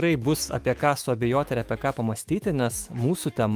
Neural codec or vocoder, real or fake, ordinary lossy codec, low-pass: autoencoder, 48 kHz, 128 numbers a frame, DAC-VAE, trained on Japanese speech; fake; Opus, 32 kbps; 14.4 kHz